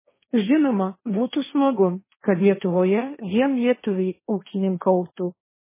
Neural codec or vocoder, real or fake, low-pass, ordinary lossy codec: codec, 16 kHz, 1.1 kbps, Voila-Tokenizer; fake; 3.6 kHz; MP3, 16 kbps